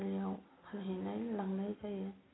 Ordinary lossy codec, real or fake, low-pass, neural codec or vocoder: AAC, 16 kbps; real; 7.2 kHz; none